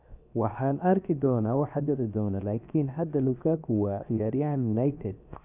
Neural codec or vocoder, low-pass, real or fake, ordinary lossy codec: codec, 16 kHz, 0.7 kbps, FocalCodec; 3.6 kHz; fake; none